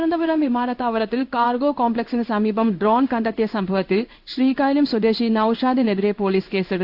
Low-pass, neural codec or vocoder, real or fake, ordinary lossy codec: 5.4 kHz; codec, 16 kHz in and 24 kHz out, 1 kbps, XY-Tokenizer; fake; AAC, 48 kbps